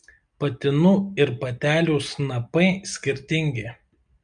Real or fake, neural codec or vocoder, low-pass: real; none; 9.9 kHz